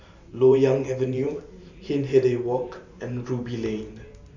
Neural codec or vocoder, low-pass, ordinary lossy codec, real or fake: vocoder, 44.1 kHz, 128 mel bands every 512 samples, BigVGAN v2; 7.2 kHz; none; fake